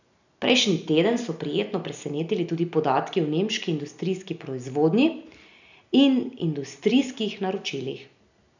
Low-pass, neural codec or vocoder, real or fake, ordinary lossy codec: 7.2 kHz; none; real; none